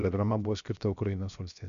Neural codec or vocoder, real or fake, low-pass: codec, 16 kHz, 0.7 kbps, FocalCodec; fake; 7.2 kHz